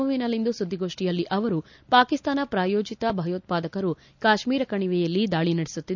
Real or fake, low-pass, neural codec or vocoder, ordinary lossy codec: real; 7.2 kHz; none; none